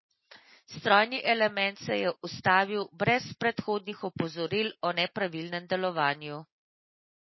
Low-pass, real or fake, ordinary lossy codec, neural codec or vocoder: 7.2 kHz; real; MP3, 24 kbps; none